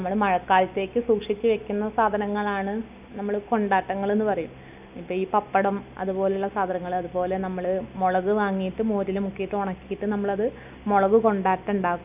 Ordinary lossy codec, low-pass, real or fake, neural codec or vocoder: none; 3.6 kHz; real; none